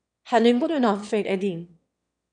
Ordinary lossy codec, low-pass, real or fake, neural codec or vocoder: MP3, 96 kbps; 9.9 kHz; fake; autoencoder, 22.05 kHz, a latent of 192 numbers a frame, VITS, trained on one speaker